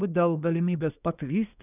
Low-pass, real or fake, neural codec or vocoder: 3.6 kHz; fake; codec, 24 kHz, 1 kbps, SNAC